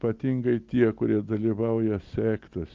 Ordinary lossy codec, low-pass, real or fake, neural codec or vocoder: Opus, 24 kbps; 7.2 kHz; real; none